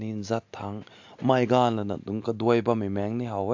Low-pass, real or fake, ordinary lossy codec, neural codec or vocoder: 7.2 kHz; real; AAC, 48 kbps; none